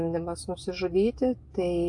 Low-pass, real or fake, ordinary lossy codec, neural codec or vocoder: 10.8 kHz; real; AAC, 64 kbps; none